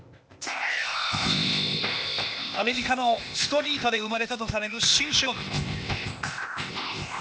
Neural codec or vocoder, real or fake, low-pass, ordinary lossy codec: codec, 16 kHz, 0.8 kbps, ZipCodec; fake; none; none